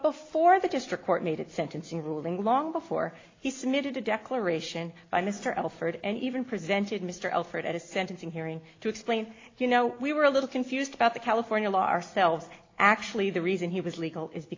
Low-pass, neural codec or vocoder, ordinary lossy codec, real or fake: 7.2 kHz; none; AAC, 32 kbps; real